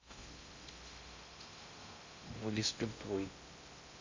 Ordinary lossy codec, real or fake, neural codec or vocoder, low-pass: MP3, 64 kbps; fake; codec, 16 kHz in and 24 kHz out, 0.6 kbps, FocalCodec, streaming, 2048 codes; 7.2 kHz